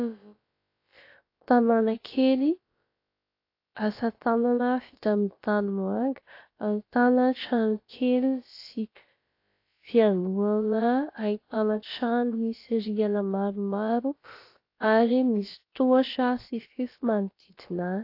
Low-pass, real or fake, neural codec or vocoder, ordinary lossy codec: 5.4 kHz; fake; codec, 16 kHz, about 1 kbps, DyCAST, with the encoder's durations; AAC, 32 kbps